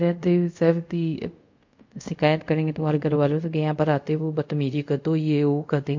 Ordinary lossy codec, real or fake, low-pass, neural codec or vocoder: MP3, 48 kbps; fake; 7.2 kHz; codec, 24 kHz, 0.5 kbps, DualCodec